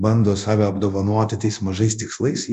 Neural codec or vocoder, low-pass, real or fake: codec, 24 kHz, 0.9 kbps, DualCodec; 10.8 kHz; fake